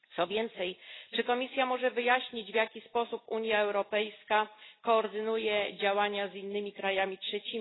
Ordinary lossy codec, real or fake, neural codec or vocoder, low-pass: AAC, 16 kbps; real; none; 7.2 kHz